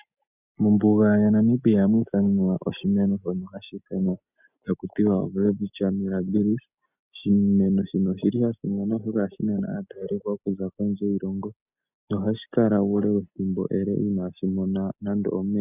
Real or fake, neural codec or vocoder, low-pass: real; none; 3.6 kHz